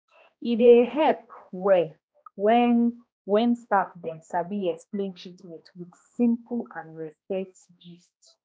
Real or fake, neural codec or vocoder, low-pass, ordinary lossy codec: fake; codec, 16 kHz, 1 kbps, X-Codec, HuBERT features, trained on balanced general audio; none; none